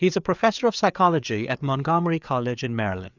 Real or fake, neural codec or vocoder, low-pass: fake; codec, 16 kHz, 4 kbps, FreqCodec, larger model; 7.2 kHz